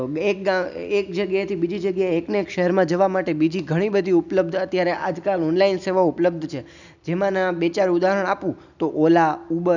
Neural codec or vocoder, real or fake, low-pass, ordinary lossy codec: none; real; 7.2 kHz; none